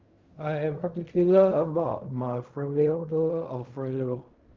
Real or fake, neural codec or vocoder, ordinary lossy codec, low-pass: fake; codec, 16 kHz in and 24 kHz out, 0.4 kbps, LongCat-Audio-Codec, fine tuned four codebook decoder; Opus, 32 kbps; 7.2 kHz